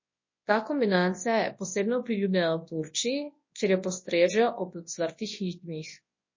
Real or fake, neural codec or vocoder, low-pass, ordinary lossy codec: fake; codec, 24 kHz, 0.9 kbps, WavTokenizer, large speech release; 7.2 kHz; MP3, 32 kbps